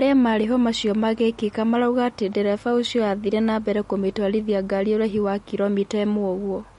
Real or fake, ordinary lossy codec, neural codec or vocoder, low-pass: real; MP3, 48 kbps; none; 14.4 kHz